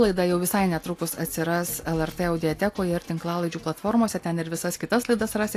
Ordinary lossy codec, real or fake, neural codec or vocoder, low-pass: AAC, 64 kbps; real; none; 14.4 kHz